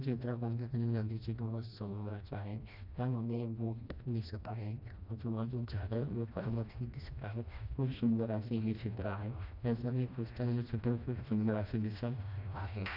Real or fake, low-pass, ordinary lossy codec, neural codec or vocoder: fake; 5.4 kHz; none; codec, 16 kHz, 1 kbps, FreqCodec, smaller model